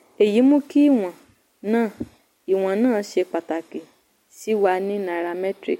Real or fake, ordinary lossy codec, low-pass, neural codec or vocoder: real; MP3, 64 kbps; 19.8 kHz; none